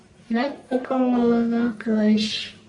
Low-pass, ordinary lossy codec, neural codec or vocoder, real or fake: 10.8 kHz; MP3, 48 kbps; codec, 44.1 kHz, 1.7 kbps, Pupu-Codec; fake